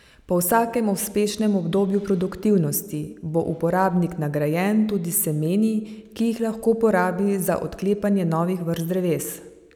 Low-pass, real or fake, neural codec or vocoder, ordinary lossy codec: 19.8 kHz; real; none; none